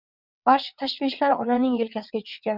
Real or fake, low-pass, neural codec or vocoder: fake; 5.4 kHz; vocoder, 22.05 kHz, 80 mel bands, WaveNeXt